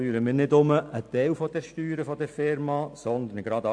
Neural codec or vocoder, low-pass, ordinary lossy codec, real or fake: none; 9.9 kHz; AAC, 64 kbps; real